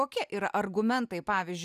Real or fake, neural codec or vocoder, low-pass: real; none; 14.4 kHz